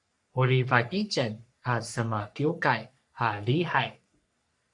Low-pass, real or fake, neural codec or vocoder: 10.8 kHz; fake; codec, 44.1 kHz, 3.4 kbps, Pupu-Codec